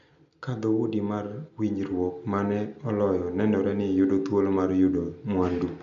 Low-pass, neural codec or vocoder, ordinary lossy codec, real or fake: 7.2 kHz; none; none; real